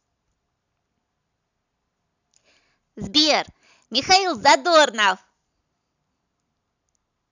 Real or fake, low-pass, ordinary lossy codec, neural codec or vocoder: real; 7.2 kHz; none; none